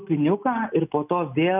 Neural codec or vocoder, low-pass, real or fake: none; 3.6 kHz; real